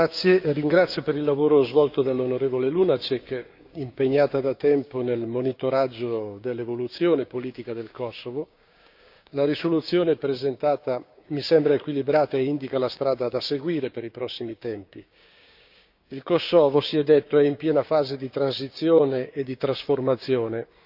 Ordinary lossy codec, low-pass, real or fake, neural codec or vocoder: none; 5.4 kHz; fake; codec, 16 kHz, 6 kbps, DAC